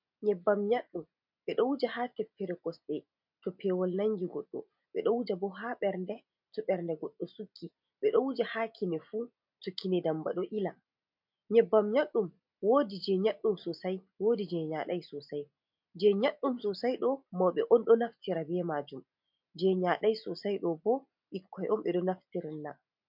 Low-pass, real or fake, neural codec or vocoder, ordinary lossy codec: 5.4 kHz; real; none; MP3, 48 kbps